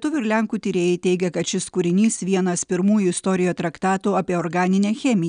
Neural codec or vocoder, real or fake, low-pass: none; real; 9.9 kHz